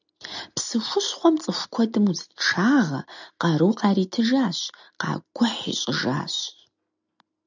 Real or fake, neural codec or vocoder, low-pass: real; none; 7.2 kHz